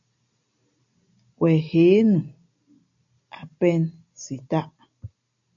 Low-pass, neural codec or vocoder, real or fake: 7.2 kHz; none; real